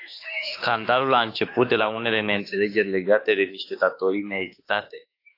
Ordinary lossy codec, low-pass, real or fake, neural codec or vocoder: AAC, 32 kbps; 5.4 kHz; fake; autoencoder, 48 kHz, 32 numbers a frame, DAC-VAE, trained on Japanese speech